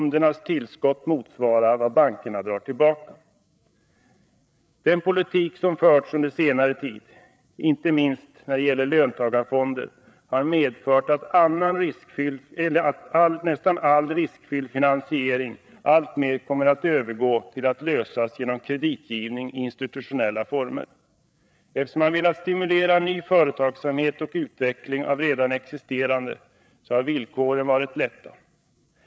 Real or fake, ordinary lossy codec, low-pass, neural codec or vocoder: fake; none; none; codec, 16 kHz, 8 kbps, FreqCodec, larger model